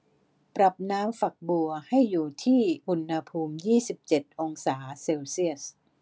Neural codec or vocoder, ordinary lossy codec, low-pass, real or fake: none; none; none; real